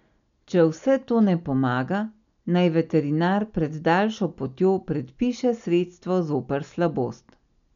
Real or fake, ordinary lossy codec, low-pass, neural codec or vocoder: real; MP3, 96 kbps; 7.2 kHz; none